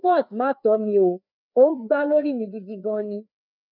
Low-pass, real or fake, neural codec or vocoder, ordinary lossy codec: 5.4 kHz; fake; codec, 16 kHz, 2 kbps, FreqCodec, larger model; none